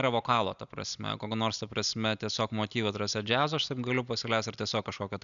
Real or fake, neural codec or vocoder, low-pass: real; none; 7.2 kHz